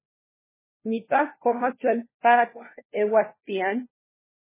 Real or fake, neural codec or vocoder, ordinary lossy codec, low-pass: fake; codec, 16 kHz, 1 kbps, FunCodec, trained on LibriTTS, 50 frames a second; MP3, 16 kbps; 3.6 kHz